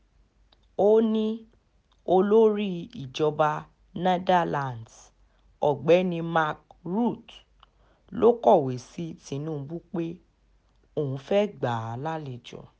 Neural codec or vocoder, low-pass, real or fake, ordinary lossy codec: none; none; real; none